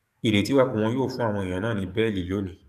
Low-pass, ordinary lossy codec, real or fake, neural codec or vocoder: 14.4 kHz; none; fake; codec, 44.1 kHz, 7.8 kbps, DAC